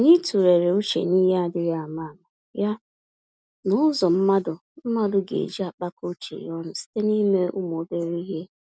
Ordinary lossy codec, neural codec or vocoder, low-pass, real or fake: none; none; none; real